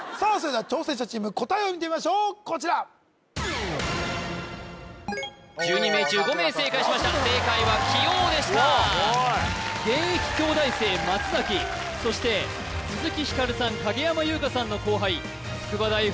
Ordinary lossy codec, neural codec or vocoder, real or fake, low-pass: none; none; real; none